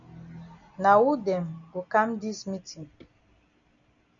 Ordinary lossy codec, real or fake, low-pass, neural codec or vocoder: MP3, 96 kbps; real; 7.2 kHz; none